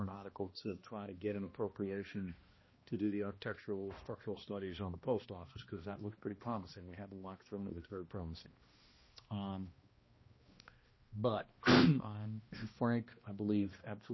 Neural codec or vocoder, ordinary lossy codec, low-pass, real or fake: codec, 16 kHz, 1 kbps, X-Codec, HuBERT features, trained on balanced general audio; MP3, 24 kbps; 7.2 kHz; fake